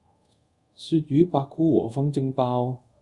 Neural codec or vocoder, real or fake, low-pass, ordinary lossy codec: codec, 24 kHz, 0.5 kbps, DualCodec; fake; 10.8 kHz; Opus, 64 kbps